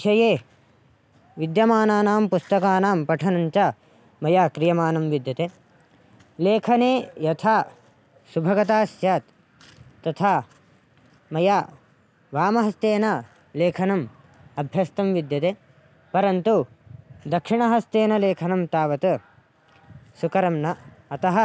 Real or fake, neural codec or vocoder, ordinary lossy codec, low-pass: real; none; none; none